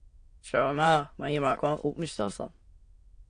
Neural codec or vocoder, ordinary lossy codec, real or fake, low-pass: autoencoder, 22.05 kHz, a latent of 192 numbers a frame, VITS, trained on many speakers; AAC, 48 kbps; fake; 9.9 kHz